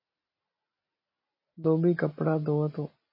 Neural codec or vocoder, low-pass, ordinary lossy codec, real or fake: none; 5.4 kHz; MP3, 24 kbps; real